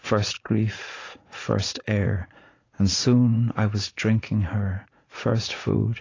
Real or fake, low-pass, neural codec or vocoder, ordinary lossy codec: fake; 7.2 kHz; vocoder, 44.1 kHz, 80 mel bands, Vocos; AAC, 32 kbps